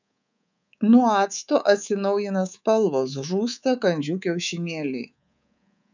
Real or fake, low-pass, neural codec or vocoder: fake; 7.2 kHz; codec, 24 kHz, 3.1 kbps, DualCodec